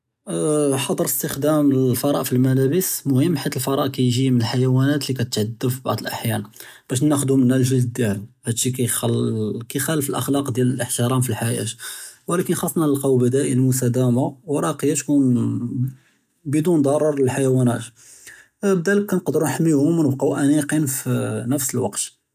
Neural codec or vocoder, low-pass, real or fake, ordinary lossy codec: none; 14.4 kHz; real; none